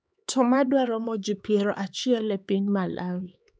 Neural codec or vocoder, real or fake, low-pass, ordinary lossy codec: codec, 16 kHz, 4 kbps, X-Codec, HuBERT features, trained on LibriSpeech; fake; none; none